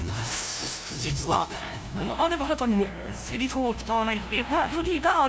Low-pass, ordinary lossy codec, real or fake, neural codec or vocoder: none; none; fake; codec, 16 kHz, 0.5 kbps, FunCodec, trained on LibriTTS, 25 frames a second